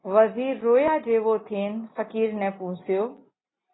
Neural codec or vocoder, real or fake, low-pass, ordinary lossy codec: none; real; 7.2 kHz; AAC, 16 kbps